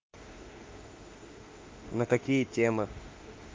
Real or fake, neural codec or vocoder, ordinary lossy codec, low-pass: fake; autoencoder, 48 kHz, 32 numbers a frame, DAC-VAE, trained on Japanese speech; Opus, 32 kbps; 7.2 kHz